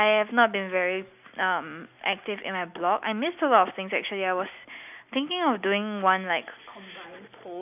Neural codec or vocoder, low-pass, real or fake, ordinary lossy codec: none; 3.6 kHz; real; none